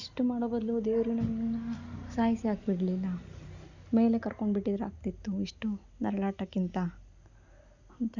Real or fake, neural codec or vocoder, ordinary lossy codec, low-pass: real; none; none; 7.2 kHz